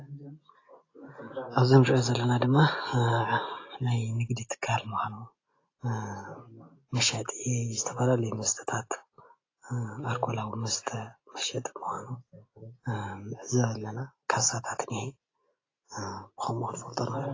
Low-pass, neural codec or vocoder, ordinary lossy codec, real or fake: 7.2 kHz; none; AAC, 32 kbps; real